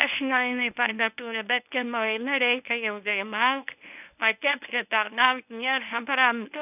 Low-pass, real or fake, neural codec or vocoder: 3.6 kHz; fake; codec, 24 kHz, 0.9 kbps, WavTokenizer, medium speech release version 1